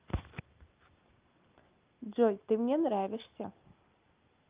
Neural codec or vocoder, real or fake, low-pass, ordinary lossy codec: none; real; 3.6 kHz; Opus, 24 kbps